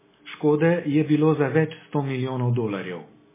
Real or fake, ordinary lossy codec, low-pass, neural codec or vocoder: real; MP3, 16 kbps; 3.6 kHz; none